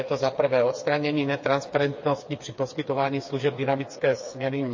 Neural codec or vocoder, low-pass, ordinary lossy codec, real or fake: codec, 16 kHz, 4 kbps, FreqCodec, smaller model; 7.2 kHz; MP3, 32 kbps; fake